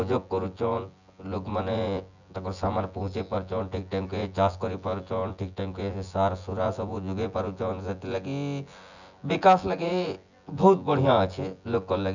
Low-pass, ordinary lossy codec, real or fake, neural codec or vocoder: 7.2 kHz; none; fake; vocoder, 24 kHz, 100 mel bands, Vocos